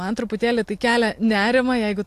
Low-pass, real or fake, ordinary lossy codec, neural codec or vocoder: 14.4 kHz; real; AAC, 64 kbps; none